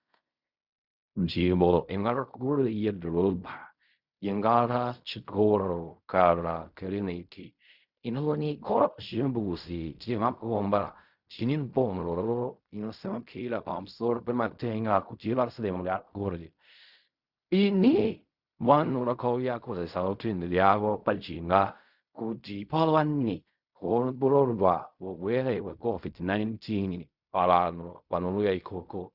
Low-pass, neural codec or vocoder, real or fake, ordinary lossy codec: 5.4 kHz; codec, 16 kHz in and 24 kHz out, 0.4 kbps, LongCat-Audio-Codec, fine tuned four codebook decoder; fake; Opus, 64 kbps